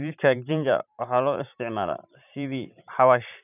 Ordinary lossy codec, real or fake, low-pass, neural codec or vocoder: none; fake; 3.6 kHz; vocoder, 44.1 kHz, 128 mel bands every 512 samples, BigVGAN v2